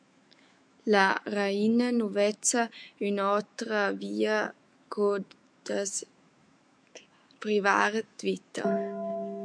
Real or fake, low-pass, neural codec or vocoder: fake; 9.9 kHz; autoencoder, 48 kHz, 128 numbers a frame, DAC-VAE, trained on Japanese speech